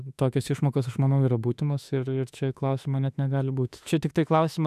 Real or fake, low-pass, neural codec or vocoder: fake; 14.4 kHz; autoencoder, 48 kHz, 32 numbers a frame, DAC-VAE, trained on Japanese speech